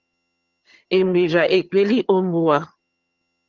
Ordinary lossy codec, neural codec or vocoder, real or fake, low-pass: Opus, 32 kbps; vocoder, 22.05 kHz, 80 mel bands, HiFi-GAN; fake; 7.2 kHz